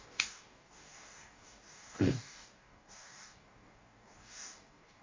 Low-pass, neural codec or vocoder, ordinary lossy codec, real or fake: 7.2 kHz; codec, 44.1 kHz, 2.6 kbps, DAC; MP3, 48 kbps; fake